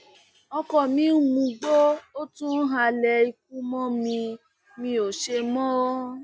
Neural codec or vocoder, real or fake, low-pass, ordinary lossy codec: none; real; none; none